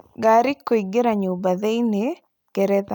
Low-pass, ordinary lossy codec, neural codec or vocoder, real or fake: 19.8 kHz; none; none; real